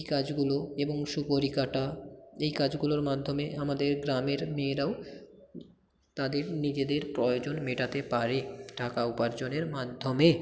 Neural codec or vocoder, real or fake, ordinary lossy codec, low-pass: none; real; none; none